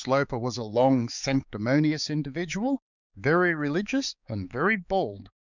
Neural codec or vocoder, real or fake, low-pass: codec, 16 kHz, 4 kbps, X-Codec, HuBERT features, trained on balanced general audio; fake; 7.2 kHz